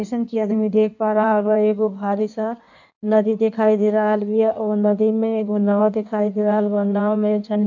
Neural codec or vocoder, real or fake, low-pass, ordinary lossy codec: codec, 16 kHz in and 24 kHz out, 1.1 kbps, FireRedTTS-2 codec; fake; 7.2 kHz; none